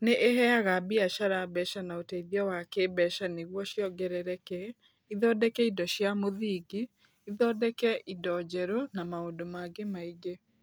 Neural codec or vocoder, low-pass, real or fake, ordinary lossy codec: none; none; real; none